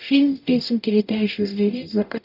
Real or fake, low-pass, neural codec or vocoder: fake; 5.4 kHz; codec, 44.1 kHz, 0.9 kbps, DAC